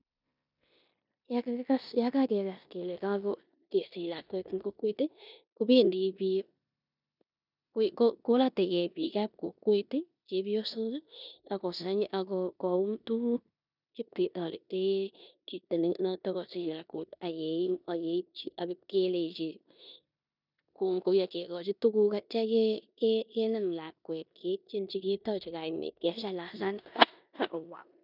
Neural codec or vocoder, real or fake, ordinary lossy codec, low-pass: codec, 16 kHz in and 24 kHz out, 0.9 kbps, LongCat-Audio-Codec, four codebook decoder; fake; none; 5.4 kHz